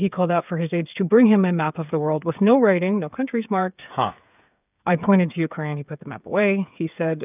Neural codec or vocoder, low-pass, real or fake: codec, 16 kHz, 8 kbps, FreqCodec, smaller model; 3.6 kHz; fake